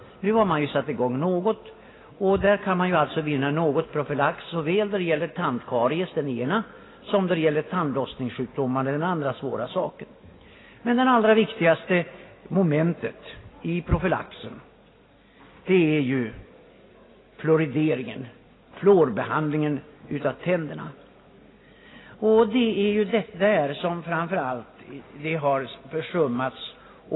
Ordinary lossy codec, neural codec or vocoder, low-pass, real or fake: AAC, 16 kbps; none; 7.2 kHz; real